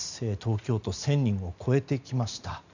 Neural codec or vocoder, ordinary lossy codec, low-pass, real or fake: none; none; 7.2 kHz; real